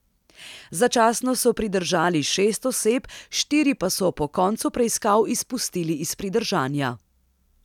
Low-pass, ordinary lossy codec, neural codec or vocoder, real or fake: 19.8 kHz; none; none; real